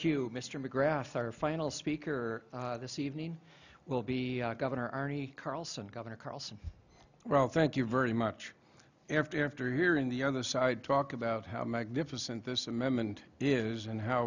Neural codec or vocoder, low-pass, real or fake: none; 7.2 kHz; real